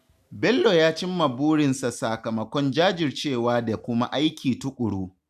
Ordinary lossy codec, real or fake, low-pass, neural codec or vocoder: none; real; 14.4 kHz; none